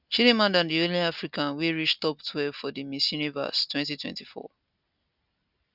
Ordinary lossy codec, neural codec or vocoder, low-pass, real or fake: none; none; 5.4 kHz; real